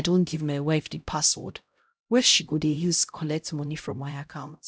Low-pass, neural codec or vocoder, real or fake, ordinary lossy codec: none; codec, 16 kHz, 0.5 kbps, X-Codec, HuBERT features, trained on LibriSpeech; fake; none